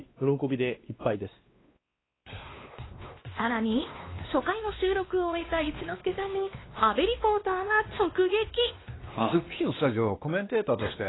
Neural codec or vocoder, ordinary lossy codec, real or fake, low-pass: codec, 16 kHz, 2 kbps, X-Codec, WavLM features, trained on Multilingual LibriSpeech; AAC, 16 kbps; fake; 7.2 kHz